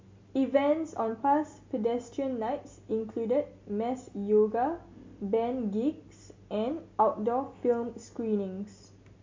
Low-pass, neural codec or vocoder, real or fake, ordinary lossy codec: 7.2 kHz; none; real; MP3, 48 kbps